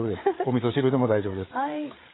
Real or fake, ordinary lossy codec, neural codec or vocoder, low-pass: fake; AAC, 16 kbps; codec, 16 kHz, 8 kbps, FreqCodec, larger model; 7.2 kHz